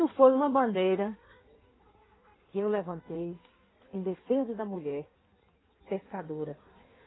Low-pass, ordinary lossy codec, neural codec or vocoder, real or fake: 7.2 kHz; AAC, 16 kbps; codec, 16 kHz in and 24 kHz out, 1.1 kbps, FireRedTTS-2 codec; fake